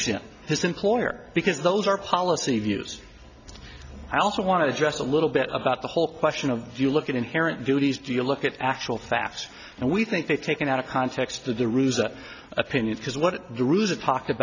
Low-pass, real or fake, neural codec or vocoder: 7.2 kHz; real; none